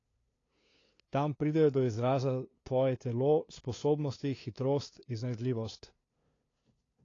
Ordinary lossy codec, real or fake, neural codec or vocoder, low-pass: AAC, 32 kbps; fake; codec, 16 kHz, 2 kbps, FunCodec, trained on LibriTTS, 25 frames a second; 7.2 kHz